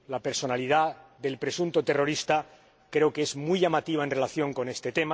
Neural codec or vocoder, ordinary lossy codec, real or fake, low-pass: none; none; real; none